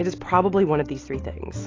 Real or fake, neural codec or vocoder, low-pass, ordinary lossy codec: real; none; 7.2 kHz; AAC, 32 kbps